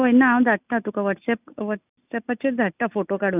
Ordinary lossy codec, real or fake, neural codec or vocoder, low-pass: none; real; none; 3.6 kHz